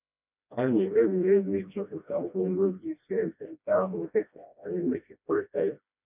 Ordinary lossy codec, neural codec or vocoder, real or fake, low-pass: none; codec, 16 kHz, 1 kbps, FreqCodec, smaller model; fake; 3.6 kHz